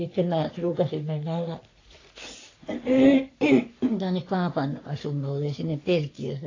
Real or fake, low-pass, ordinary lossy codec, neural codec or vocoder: fake; 7.2 kHz; AAC, 32 kbps; codec, 44.1 kHz, 3.4 kbps, Pupu-Codec